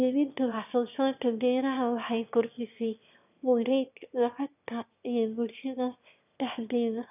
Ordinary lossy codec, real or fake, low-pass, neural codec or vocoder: none; fake; 3.6 kHz; autoencoder, 22.05 kHz, a latent of 192 numbers a frame, VITS, trained on one speaker